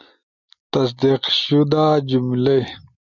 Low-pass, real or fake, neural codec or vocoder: 7.2 kHz; real; none